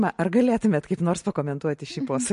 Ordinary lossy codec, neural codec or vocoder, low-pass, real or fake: MP3, 48 kbps; none; 10.8 kHz; real